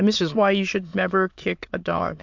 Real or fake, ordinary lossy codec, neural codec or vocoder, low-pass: fake; MP3, 64 kbps; autoencoder, 22.05 kHz, a latent of 192 numbers a frame, VITS, trained on many speakers; 7.2 kHz